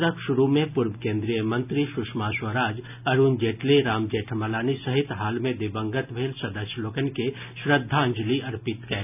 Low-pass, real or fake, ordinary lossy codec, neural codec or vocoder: 3.6 kHz; real; none; none